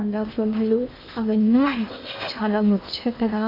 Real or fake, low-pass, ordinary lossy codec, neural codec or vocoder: fake; 5.4 kHz; none; codec, 16 kHz in and 24 kHz out, 0.8 kbps, FocalCodec, streaming, 65536 codes